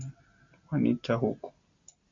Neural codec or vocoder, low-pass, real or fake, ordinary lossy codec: none; 7.2 kHz; real; AAC, 48 kbps